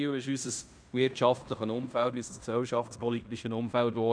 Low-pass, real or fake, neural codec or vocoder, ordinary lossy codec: 9.9 kHz; fake; codec, 16 kHz in and 24 kHz out, 0.9 kbps, LongCat-Audio-Codec, fine tuned four codebook decoder; none